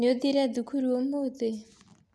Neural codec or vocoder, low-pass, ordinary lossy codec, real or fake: none; none; none; real